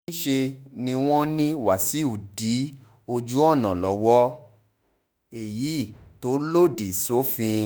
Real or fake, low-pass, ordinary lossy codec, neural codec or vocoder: fake; none; none; autoencoder, 48 kHz, 32 numbers a frame, DAC-VAE, trained on Japanese speech